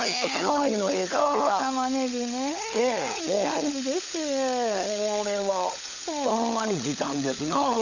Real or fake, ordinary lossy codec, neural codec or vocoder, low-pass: fake; none; codec, 16 kHz, 16 kbps, FunCodec, trained on LibriTTS, 50 frames a second; 7.2 kHz